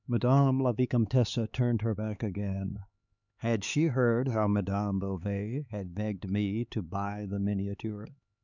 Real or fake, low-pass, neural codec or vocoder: fake; 7.2 kHz; codec, 16 kHz, 4 kbps, X-Codec, HuBERT features, trained on LibriSpeech